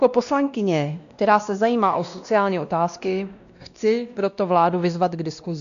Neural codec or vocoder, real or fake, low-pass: codec, 16 kHz, 1 kbps, X-Codec, WavLM features, trained on Multilingual LibriSpeech; fake; 7.2 kHz